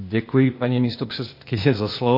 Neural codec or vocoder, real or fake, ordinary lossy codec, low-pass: codec, 16 kHz, 0.8 kbps, ZipCodec; fake; MP3, 32 kbps; 5.4 kHz